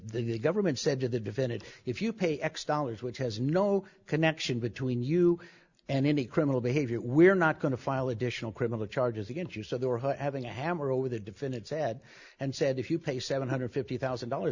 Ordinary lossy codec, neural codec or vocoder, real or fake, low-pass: Opus, 64 kbps; none; real; 7.2 kHz